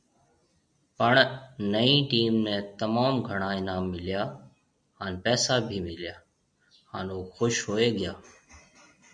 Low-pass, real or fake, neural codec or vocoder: 9.9 kHz; real; none